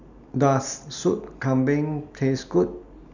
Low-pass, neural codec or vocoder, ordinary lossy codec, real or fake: 7.2 kHz; none; none; real